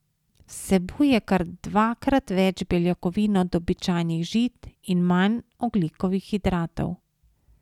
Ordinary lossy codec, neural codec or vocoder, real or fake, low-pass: none; none; real; 19.8 kHz